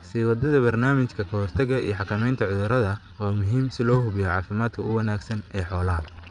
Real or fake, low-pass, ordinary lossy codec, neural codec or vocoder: fake; 9.9 kHz; none; vocoder, 22.05 kHz, 80 mel bands, Vocos